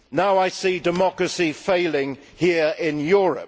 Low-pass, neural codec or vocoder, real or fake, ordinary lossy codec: none; none; real; none